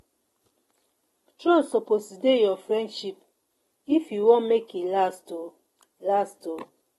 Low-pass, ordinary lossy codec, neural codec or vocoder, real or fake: 19.8 kHz; AAC, 32 kbps; none; real